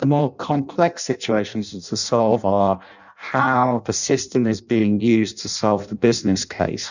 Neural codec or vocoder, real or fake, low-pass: codec, 16 kHz in and 24 kHz out, 0.6 kbps, FireRedTTS-2 codec; fake; 7.2 kHz